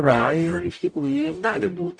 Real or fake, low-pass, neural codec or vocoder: fake; 9.9 kHz; codec, 44.1 kHz, 0.9 kbps, DAC